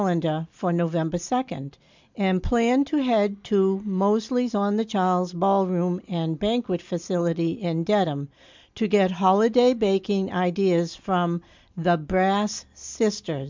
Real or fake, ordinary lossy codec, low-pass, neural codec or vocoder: real; MP3, 64 kbps; 7.2 kHz; none